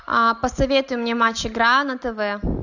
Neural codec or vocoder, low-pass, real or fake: none; 7.2 kHz; real